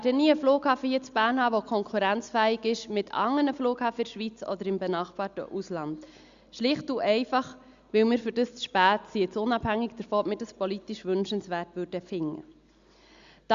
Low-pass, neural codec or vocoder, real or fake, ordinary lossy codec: 7.2 kHz; none; real; Opus, 64 kbps